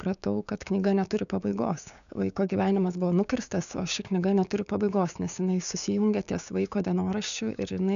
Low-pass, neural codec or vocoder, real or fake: 7.2 kHz; codec, 16 kHz, 6 kbps, DAC; fake